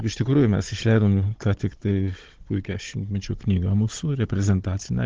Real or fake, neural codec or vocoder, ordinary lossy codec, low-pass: fake; codec, 16 kHz, 16 kbps, FunCodec, trained on Chinese and English, 50 frames a second; Opus, 16 kbps; 7.2 kHz